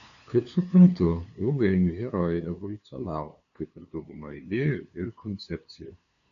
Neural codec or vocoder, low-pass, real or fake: codec, 16 kHz, 2 kbps, FunCodec, trained on LibriTTS, 25 frames a second; 7.2 kHz; fake